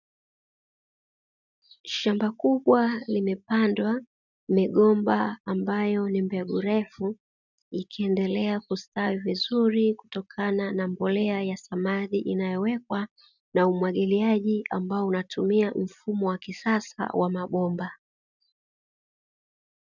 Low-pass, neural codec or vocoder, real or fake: 7.2 kHz; none; real